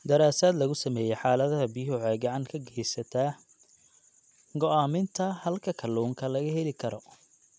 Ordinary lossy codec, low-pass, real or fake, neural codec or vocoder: none; none; real; none